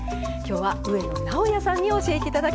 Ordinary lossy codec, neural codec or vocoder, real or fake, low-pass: none; none; real; none